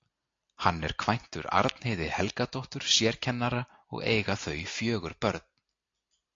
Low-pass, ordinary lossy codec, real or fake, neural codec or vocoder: 7.2 kHz; AAC, 48 kbps; real; none